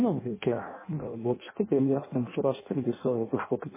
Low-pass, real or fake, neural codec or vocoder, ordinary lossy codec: 3.6 kHz; fake; codec, 16 kHz in and 24 kHz out, 0.6 kbps, FireRedTTS-2 codec; MP3, 16 kbps